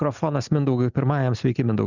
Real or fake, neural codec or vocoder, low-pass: real; none; 7.2 kHz